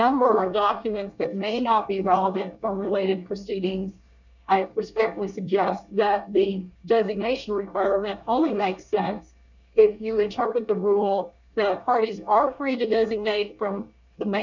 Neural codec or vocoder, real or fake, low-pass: codec, 24 kHz, 1 kbps, SNAC; fake; 7.2 kHz